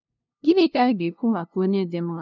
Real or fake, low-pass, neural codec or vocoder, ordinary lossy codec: fake; 7.2 kHz; codec, 16 kHz, 0.5 kbps, FunCodec, trained on LibriTTS, 25 frames a second; none